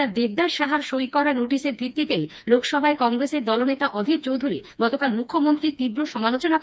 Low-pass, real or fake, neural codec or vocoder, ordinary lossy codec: none; fake; codec, 16 kHz, 2 kbps, FreqCodec, smaller model; none